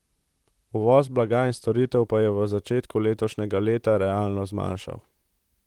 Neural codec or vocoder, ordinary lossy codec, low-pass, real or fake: vocoder, 44.1 kHz, 128 mel bands, Pupu-Vocoder; Opus, 24 kbps; 19.8 kHz; fake